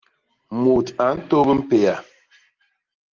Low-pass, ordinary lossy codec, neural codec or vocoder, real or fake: 7.2 kHz; Opus, 16 kbps; none; real